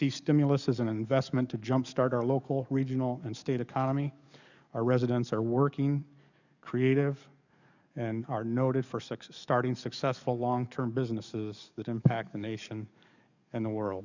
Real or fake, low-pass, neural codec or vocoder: fake; 7.2 kHz; codec, 44.1 kHz, 7.8 kbps, DAC